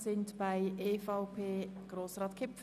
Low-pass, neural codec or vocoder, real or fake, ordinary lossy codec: 14.4 kHz; none; real; none